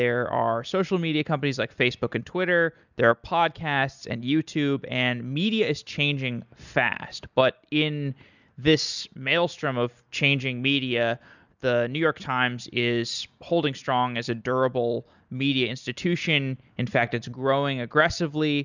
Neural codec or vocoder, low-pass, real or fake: none; 7.2 kHz; real